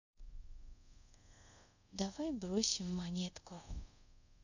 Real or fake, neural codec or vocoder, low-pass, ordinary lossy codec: fake; codec, 24 kHz, 0.5 kbps, DualCodec; 7.2 kHz; none